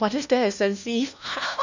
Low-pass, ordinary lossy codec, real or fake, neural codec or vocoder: 7.2 kHz; none; fake; codec, 16 kHz, 1 kbps, FunCodec, trained on LibriTTS, 50 frames a second